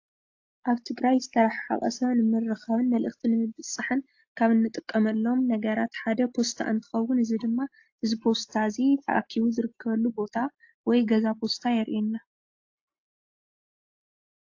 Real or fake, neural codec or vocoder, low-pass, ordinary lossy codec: real; none; 7.2 kHz; AAC, 48 kbps